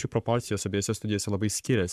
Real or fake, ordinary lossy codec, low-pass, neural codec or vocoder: fake; AAC, 96 kbps; 14.4 kHz; codec, 44.1 kHz, 7.8 kbps, Pupu-Codec